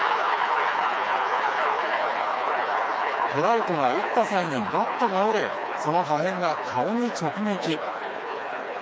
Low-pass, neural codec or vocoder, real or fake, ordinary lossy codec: none; codec, 16 kHz, 2 kbps, FreqCodec, smaller model; fake; none